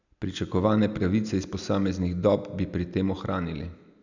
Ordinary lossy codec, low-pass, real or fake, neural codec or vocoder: none; 7.2 kHz; real; none